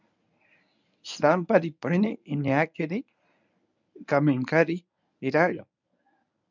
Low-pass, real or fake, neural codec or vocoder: 7.2 kHz; fake; codec, 24 kHz, 0.9 kbps, WavTokenizer, medium speech release version 1